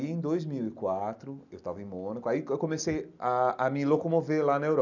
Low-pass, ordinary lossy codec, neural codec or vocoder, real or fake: 7.2 kHz; none; none; real